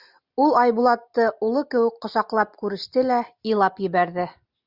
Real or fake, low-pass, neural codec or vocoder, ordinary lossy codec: real; 5.4 kHz; none; Opus, 64 kbps